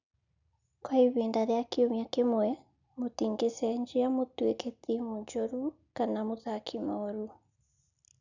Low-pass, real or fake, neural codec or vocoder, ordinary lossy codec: 7.2 kHz; real; none; none